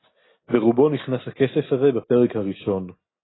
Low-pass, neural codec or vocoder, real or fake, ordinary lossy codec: 7.2 kHz; none; real; AAC, 16 kbps